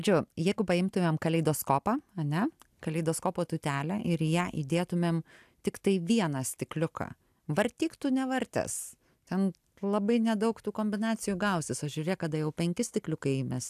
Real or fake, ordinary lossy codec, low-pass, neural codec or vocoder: real; AAC, 96 kbps; 14.4 kHz; none